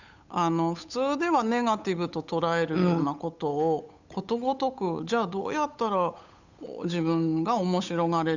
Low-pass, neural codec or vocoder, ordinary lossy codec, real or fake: 7.2 kHz; codec, 16 kHz, 8 kbps, FunCodec, trained on Chinese and English, 25 frames a second; none; fake